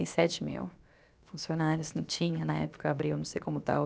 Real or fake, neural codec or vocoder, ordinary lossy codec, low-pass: fake; codec, 16 kHz, about 1 kbps, DyCAST, with the encoder's durations; none; none